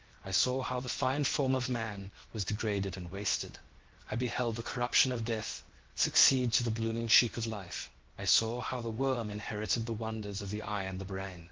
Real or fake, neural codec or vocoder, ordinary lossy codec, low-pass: fake; codec, 16 kHz, 0.7 kbps, FocalCodec; Opus, 16 kbps; 7.2 kHz